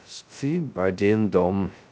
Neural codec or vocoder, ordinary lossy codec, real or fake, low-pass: codec, 16 kHz, 0.2 kbps, FocalCodec; none; fake; none